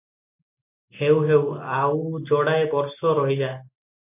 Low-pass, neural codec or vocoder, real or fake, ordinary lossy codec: 3.6 kHz; none; real; MP3, 32 kbps